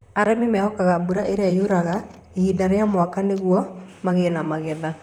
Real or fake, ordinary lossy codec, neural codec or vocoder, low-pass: fake; none; vocoder, 44.1 kHz, 128 mel bands, Pupu-Vocoder; 19.8 kHz